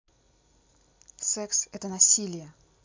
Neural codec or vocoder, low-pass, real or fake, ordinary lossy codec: none; 7.2 kHz; real; MP3, 64 kbps